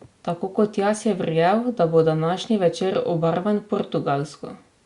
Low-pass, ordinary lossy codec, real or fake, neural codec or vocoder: 10.8 kHz; Opus, 64 kbps; real; none